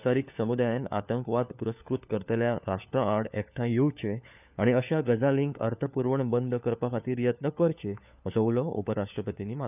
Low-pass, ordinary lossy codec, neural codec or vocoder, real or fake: 3.6 kHz; none; codec, 16 kHz, 4 kbps, FunCodec, trained on LibriTTS, 50 frames a second; fake